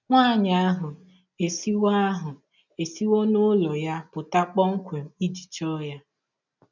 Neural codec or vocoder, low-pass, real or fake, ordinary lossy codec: none; 7.2 kHz; real; none